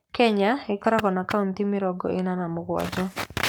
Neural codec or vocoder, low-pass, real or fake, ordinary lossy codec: codec, 44.1 kHz, 7.8 kbps, Pupu-Codec; none; fake; none